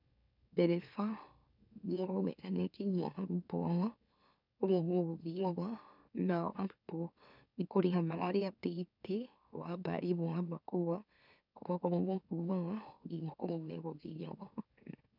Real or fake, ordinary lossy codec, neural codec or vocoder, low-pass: fake; none; autoencoder, 44.1 kHz, a latent of 192 numbers a frame, MeloTTS; 5.4 kHz